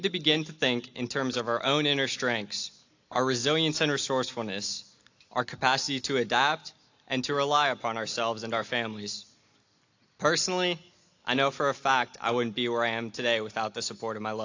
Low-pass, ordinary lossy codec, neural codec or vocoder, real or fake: 7.2 kHz; AAC, 48 kbps; none; real